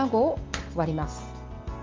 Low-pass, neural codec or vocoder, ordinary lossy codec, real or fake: 7.2 kHz; none; Opus, 32 kbps; real